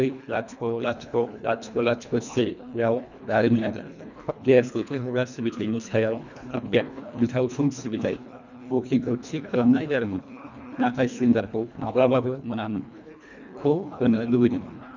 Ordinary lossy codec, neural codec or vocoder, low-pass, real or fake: none; codec, 24 kHz, 1.5 kbps, HILCodec; 7.2 kHz; fake